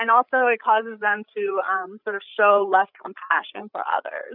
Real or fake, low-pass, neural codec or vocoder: fake; 5.4 kHz; codec, 16 kHz, 8 kbps, FreqCodec, larger model